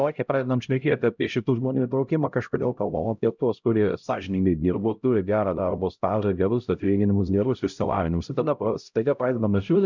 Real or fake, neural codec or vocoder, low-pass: fake; codec, 16 kHz, 0.5 kbps, X-Codec, HuBERT features, trained on LibriSpeech; 7.2 kHz